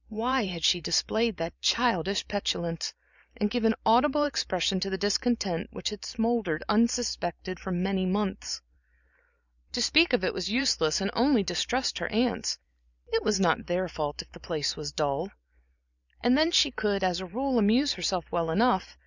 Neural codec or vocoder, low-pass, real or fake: vocoder, 44.1 kHz, 128 mel bands every 512 samples, BigVGAN v2; 7.2 kHz; fake